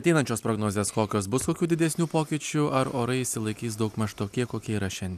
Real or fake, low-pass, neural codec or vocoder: real; 14.4 kHz; none